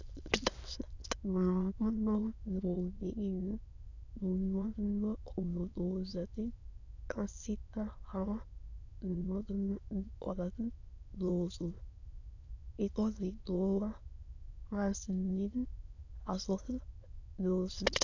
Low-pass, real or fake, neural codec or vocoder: 7.2 kHz; fake; autoencoder, 22.05 kHz, a latent of 192 numbers a frame, VITS, trained on many speakers